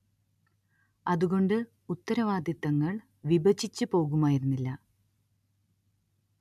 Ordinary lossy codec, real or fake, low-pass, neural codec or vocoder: none; real; 14.4 kHz; none